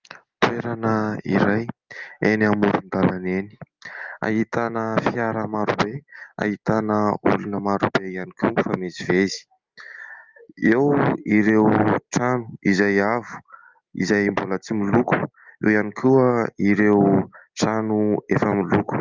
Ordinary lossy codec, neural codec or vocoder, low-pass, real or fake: Opus, 32 kbps; none; 7.2 kHz; real